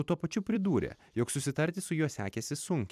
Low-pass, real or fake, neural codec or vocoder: 14.4 kHz; fake; vocoder, 44.1 kHz, 128 mel bands every 256 samples, BigVGAN v2